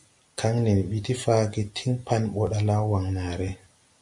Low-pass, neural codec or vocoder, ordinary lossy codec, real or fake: 10.8 kHz; none; MP3, 48 kbps; real